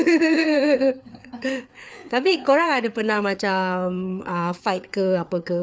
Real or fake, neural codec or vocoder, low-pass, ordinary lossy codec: fake; codec, 16 kHz, 4 kbps, FreqCodec, larger model; none; none